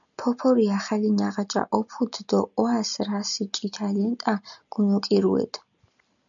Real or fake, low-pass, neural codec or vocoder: real; 7.2 kHz; none